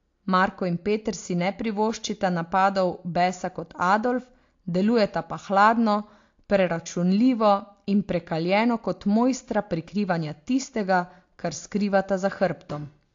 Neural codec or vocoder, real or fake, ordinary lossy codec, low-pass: none; real; AAC, 48 kbps; 7.2 kHz